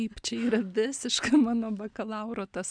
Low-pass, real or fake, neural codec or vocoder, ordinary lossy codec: 9.9 kHz; real; none; MP3, 96 kbps